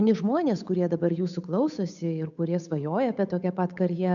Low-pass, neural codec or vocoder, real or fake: 7.2 kHz; codec, 16 kHz, 8 kbps, FunCodec, trained on Chinese and English, 25 frames a second; fake